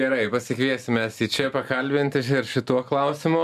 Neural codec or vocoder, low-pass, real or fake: none; 14.4 kHz; real